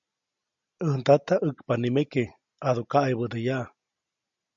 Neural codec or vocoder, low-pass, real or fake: none; 7.2 kHz; real